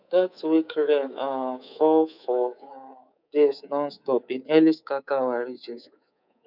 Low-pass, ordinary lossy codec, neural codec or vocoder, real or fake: 5.4 kHz; none; codec, 24 kHz, 3.1 kbps, DualCodec; fake